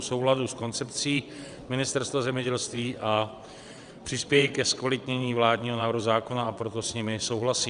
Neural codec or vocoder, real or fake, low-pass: vocoder, 22.05 kHz, 80 mel bands, WaveNeXt; fake; 9.9 kHz